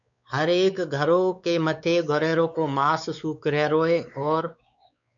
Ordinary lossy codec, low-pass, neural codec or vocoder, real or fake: MP3, 96 kbps; 7.2 kHz; codec, 16 kHz, 4 kbps, X-Codec, WavLM features, trained on Multilingual LibriSpeech; fake